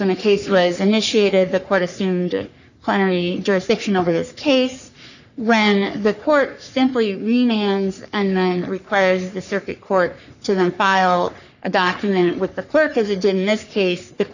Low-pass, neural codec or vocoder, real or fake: 7.2 kHz; codec, 44.1 kHz, 3.4 kbps, Pupu-Codec; fake